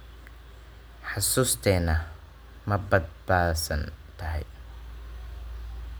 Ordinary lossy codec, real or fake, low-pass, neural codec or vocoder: none; real; none; none